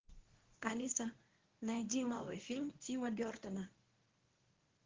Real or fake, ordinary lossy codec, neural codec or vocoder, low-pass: fake; Opus, 16 kbps; codec, 24 kHz, 0.9 kbps, WavTokenizer, medium speech release version 1; 7.2 kHz